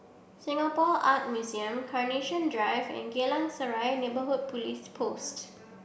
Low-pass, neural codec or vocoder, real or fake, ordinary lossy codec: none; none; real; none